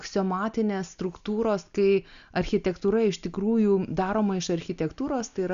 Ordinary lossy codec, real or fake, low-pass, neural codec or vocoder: AAC, 96 kbps; real; 7.2 kHz; none